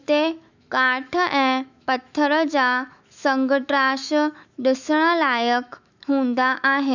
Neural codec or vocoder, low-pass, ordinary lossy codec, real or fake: autoencoder, 48 kHz, 128 numbers a frame, DAC-VAE, trained on Japanese speech; 7.2 kHz; none; fake